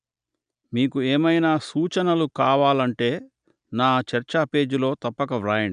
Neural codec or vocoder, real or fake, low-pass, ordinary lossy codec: none; real; 9.9 kHz; none